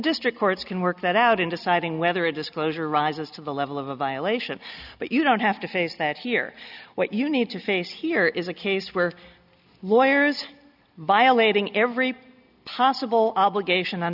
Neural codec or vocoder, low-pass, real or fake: none; 5.4 kHz; real